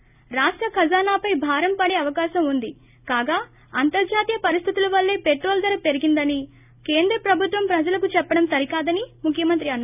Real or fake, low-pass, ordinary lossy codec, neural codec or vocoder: real; 3.6 kHz; none; none